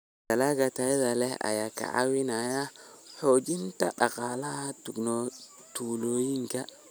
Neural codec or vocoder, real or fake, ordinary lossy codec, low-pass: none; real; none; none